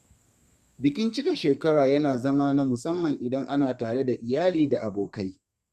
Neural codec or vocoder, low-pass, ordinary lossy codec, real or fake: codec, 32 kHz, 1.9 kbps, SNAC; 14.4 kHz; Opus, 64 kbps; fake